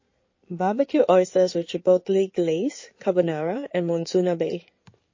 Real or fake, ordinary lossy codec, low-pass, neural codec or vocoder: fake; MP3, 32 kbps; 7.2 kHz; codec, 16 kHz in and 24 kHz out, 2.2 kbps, FireRedTTS-2 codec